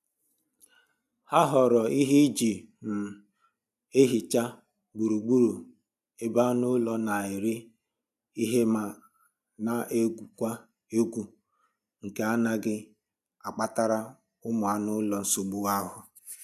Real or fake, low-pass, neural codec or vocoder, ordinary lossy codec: real; 14.4 kHz; none; none